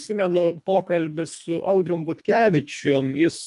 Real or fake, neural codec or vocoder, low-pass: fake; codec, 24 kHz, 1.5 kbps, HILCodec; 10.8 kHz